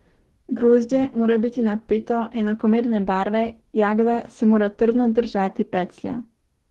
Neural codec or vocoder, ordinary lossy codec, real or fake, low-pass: codec, 44.1 kHz, 2.6 kbps, DAC; Opus, 16 kbps; fake; 19.8 kHz